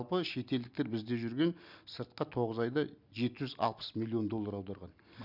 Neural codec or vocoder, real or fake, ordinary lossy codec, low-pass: none; real; none; 5.4 kHz